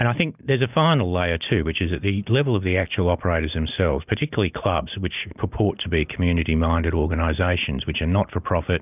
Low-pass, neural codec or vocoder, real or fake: 3.6 kHz; none; real